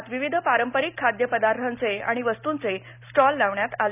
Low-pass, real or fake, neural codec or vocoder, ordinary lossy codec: 3.6 kHz; real; none; none